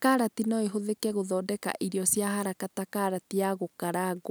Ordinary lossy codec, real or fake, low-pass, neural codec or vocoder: none; real; none; none